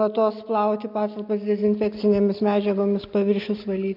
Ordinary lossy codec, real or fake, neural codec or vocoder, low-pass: MP3, 48 kbps; real; none; 5.4 kHz